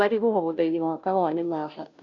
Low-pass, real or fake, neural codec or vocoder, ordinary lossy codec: 7.2 kHz; fake; codec, 16 kHz, 0.5 kbps, FunCodec, trained on Chinese and English, 25 frames a second; none